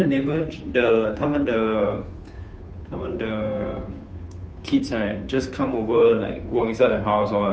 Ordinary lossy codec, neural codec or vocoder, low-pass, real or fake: none; codec, 16 kHz, 2 kbps, FunCodec, trained on Chinese and English, 25 frames a second; none; fake